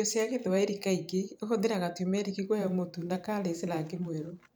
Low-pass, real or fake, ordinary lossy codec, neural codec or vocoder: none; fake; none; vocoder, 44.1 kHz, 128 mel bands every 512 samples, BigVGAN v2